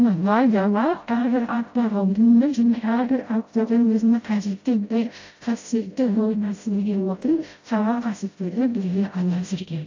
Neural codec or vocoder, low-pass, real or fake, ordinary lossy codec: codec, 16 kHz, 0.5 kbps, FreqCodec, smaller model; 7.2 kHz; fake; AAC, 48 kbps